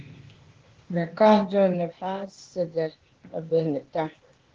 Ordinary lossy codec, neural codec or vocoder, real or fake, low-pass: Opus, 16 kbps; codec, 16 kHz, 0.8 kbps, ZipCodec; fake; 7.2 kHz